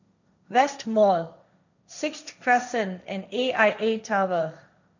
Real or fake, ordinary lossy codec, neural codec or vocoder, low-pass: fake; none; codec, 16 kHz, 1.1 kbps, Voila-Tokenizer; 7.2 kHz